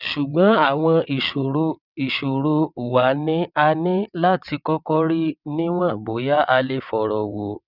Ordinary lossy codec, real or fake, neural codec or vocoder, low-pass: none; fake; vocoder, 22.05 kHz, 80 mel bands, WaveNeXt; 5.4 kHz